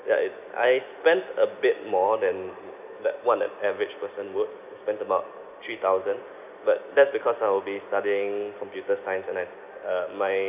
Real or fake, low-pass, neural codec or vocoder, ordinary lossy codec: real; 3.6 kHz; none; none